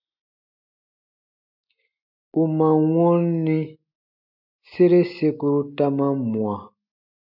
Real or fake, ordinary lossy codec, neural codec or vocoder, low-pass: real; MP3, 48 kbps; none; 5.4 kHz